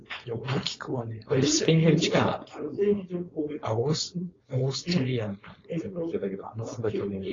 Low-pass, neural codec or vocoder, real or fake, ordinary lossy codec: 7.2 kHz; codec, 16 kHz, 4.8 kbps, FACodec; fake; AAC, 32 kbps